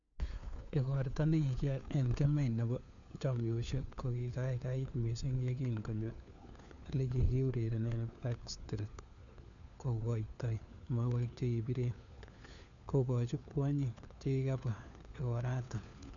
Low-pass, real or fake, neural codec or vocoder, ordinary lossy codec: 7.2 kHz; fake; codec, 16 kHz, 4 kbps, FunCodec, trained on LibriTTS, 50 frames a second; none